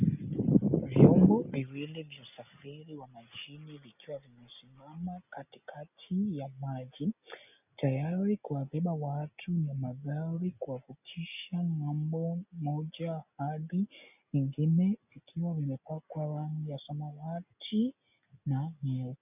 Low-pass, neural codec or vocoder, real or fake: 3.6 kHz; none; real